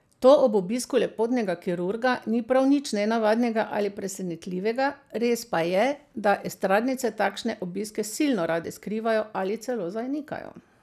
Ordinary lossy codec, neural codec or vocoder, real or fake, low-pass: none; none; real; 14.4 kHz